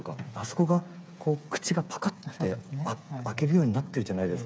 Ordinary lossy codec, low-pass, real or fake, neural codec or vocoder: none; none; fake; codec, 16 kHz, 8 kbps, FreqCodec, smaller model